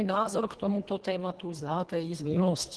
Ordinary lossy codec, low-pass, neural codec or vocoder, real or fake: Opus, 16 kbps; 10.8 kHz; codec, 24 kHz, 1.5 kbps, HILCodec; fake